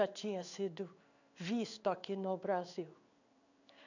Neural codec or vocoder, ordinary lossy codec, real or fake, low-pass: codec, 16 kHz in and 24 kHz out, 1 kbps, XY-Tokenizer; none; fake; 7.2 kHz